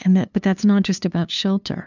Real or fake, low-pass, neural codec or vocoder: fake; 7.2 kHz; codec, 16 kHz, 2 kbps, FunCodec, trained on LibriTTS, 25 frames a second